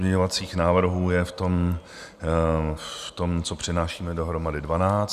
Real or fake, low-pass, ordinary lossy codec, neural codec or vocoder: real; 14.4 kHz; Opus, 64 kbps; none